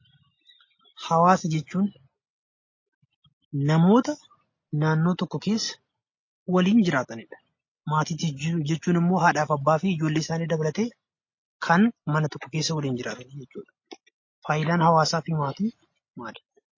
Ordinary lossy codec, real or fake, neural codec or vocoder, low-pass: MP3, 32 kbps; real; none; 7.2 kHz